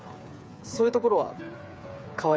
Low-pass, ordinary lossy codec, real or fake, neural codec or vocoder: none; none; fake; codec, 16 kHz, 8 kbps, FreqCodec, smaller model